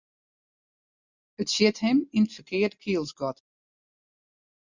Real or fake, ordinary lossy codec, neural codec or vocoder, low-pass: real; Opus, 64 kbps; none; 7.2 kHz